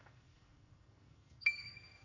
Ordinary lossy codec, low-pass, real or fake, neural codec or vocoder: none; 7.2 kHz; fake; codec, 44.1 kHz, 2.6 kbps, SNAC